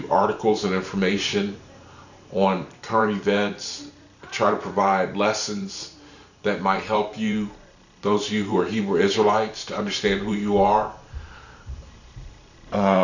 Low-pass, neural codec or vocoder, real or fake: 7.2 kHz; vocoder, 44.1 kHz, 128 mel bands every 512 samples, BigVGAN v2; fake